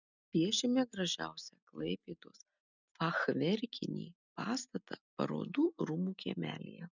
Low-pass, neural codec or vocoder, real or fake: 7.2 kHz; none; real